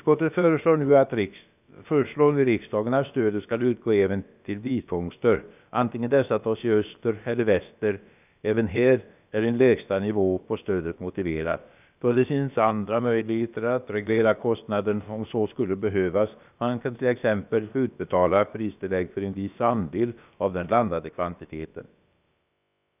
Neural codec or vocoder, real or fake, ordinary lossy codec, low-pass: codec, 16 kHz, about 1 kbps, DyCAST, with the encoder's durations; fake; none; 3.6 kHz